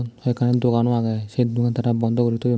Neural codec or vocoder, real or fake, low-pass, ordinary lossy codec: none; real; none; none